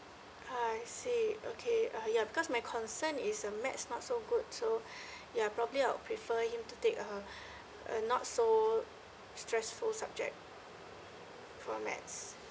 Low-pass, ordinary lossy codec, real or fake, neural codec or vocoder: none; none; real; none